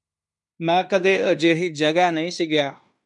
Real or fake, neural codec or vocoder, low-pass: fake; codec, 16 kHz in and 24 kHz out, 0.9 kbps, LongCat-Audio-Codec, fine tuned four codebook decoder; 10.8 kHz